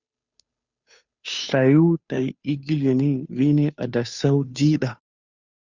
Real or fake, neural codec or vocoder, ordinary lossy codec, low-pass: fake; codec, 16 kHz, 2 kbps, FunCodec, trained on Chinese and English, 25 frames a second; Opus, 64 kbps; 7.2 kHz